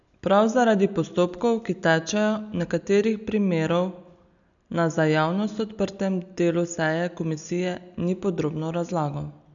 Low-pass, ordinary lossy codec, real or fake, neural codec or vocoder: 7.2 kHz; none; real; none